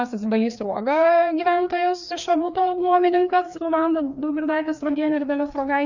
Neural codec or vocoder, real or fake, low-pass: codec, 16 kHz, 2 kbps, FreqCodec, larger model; fake; 7.2 kHz